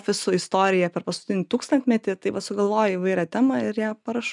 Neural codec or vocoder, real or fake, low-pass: none; real; 10.8 kHz